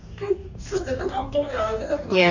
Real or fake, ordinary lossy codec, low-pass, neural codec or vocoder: fake; none; 7.2 kHz; codec, 44.1 kHz, 2.6 kbps, DAC